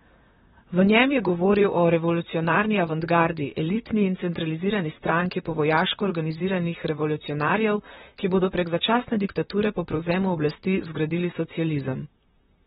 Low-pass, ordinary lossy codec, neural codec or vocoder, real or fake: 19.8 kHz; AAC, 16 kbps; vocoder, 44.1 kHz, 128 mel bands, Pupu-Vocoder; fake